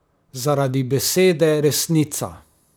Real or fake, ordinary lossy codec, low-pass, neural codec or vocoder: fake; none; none; vocoder, 44.1 kHz, 128 mel bands, Pupu-Vocoder